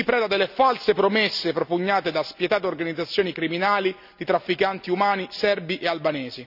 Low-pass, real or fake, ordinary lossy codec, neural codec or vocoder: 5.4 kHz; real; none; none